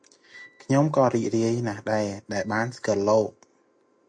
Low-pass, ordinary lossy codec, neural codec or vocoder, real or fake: 9.9 kHz; MP3, 48 kbps; none; real